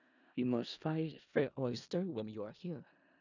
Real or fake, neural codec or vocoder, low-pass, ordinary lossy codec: fake; codec, 16 kHz in and 24 kHz out, 0.4 kbps, LongCat-Audio-Codec, four codebook decoder; 7.2 kHz; none